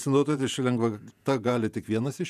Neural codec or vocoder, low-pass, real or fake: vocoder, 48 kHz, 128 mel bands, Vocos; 14.4 kHz; fake